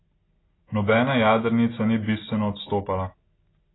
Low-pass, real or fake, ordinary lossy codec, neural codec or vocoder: 7.2 kHz; real; AAC, 16 kbps; none